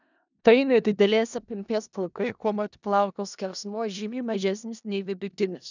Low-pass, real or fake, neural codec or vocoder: 7.2 kHz; fake; codec, 16 kHz in and 24 kHz out, 0.4 kbps, LongCat-Audio-Codec, four codebook decoder